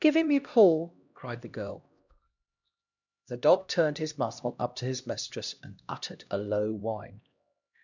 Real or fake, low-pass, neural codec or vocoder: fake; 7.2 kHz; codec, 16 kHz, 1 kbps, X-Codec, HuBERT features, trained on LibriSpeech